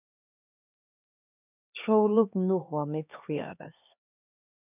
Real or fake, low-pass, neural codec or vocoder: fake; 3.6 kHz; codec, 16 kHz, 2 kbps, X-Codec, HuBERT features, trained on LibriSpeech